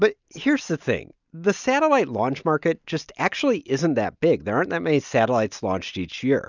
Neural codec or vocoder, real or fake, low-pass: none; real; 7.2 kHz